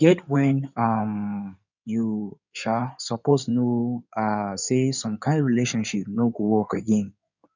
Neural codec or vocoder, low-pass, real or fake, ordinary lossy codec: codec, 16 kHz in and 24 kHz out, 2.2 kbps, FireRedTTS-2 codec; 7.2 kHz; fake; none